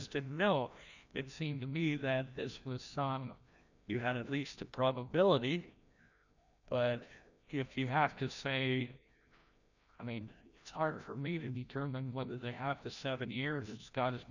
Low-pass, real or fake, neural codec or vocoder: 7.2 kHz; fake; codec, 16 kHz, 1 kbps, FreqCodec, larger model